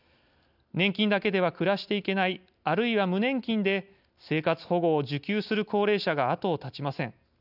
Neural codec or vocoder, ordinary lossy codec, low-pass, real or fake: none; none; 5.4 kHz; real